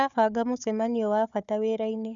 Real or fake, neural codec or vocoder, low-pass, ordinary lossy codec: fake; codec, 16 kHz, 4 kbps, FunCodec, trained on Chinese and English, 50 frames a second; 7.2 kHz; none